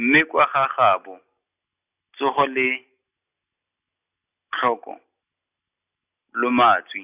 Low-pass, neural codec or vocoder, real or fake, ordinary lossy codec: 3.6 kHz; none; real; none